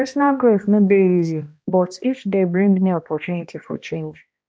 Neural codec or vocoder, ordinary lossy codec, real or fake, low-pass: codec, 16 kHz, 1 kbps, X-Codec, HuBERT features, trained on balanced general audio; none; fake; none